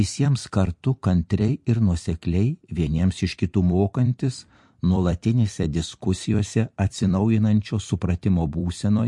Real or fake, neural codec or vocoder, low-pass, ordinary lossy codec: fake; vocoder, 44.1 kHz, 128 mel bands every 256 samples, BigVGAN v2; 10.8 kHz; MP3, 48 kbps